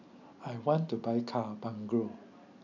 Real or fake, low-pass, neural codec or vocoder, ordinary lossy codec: real; 7.2 kHz; none; none